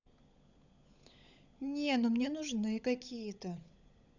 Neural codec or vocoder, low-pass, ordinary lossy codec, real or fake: codec, 16 kHz, 16 kbps, FunCodec, trained on LibriTTS, 50 frames a second; 7.2 kHz; none; fake